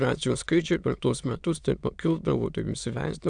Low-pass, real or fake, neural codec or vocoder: 9.9 kHz; fake; autoencoder, 22.05 kHz, a latent of 192 numbers a frame, VITS, trained on many speakers